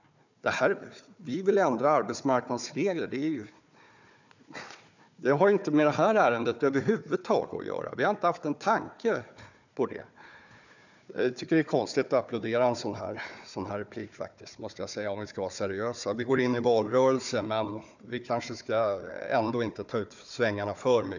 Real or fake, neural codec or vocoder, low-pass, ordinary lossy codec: fake; codec, 16 kHz, 4 kbps, FunCodec, trained on Chinese and English, 50 frames a second; 7.2 kHz; none